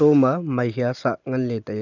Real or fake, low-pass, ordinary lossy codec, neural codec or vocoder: real; 7.2 kHz; none; none